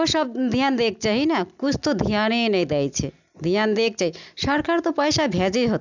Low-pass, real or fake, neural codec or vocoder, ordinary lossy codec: 7.2 kHz; real; none; none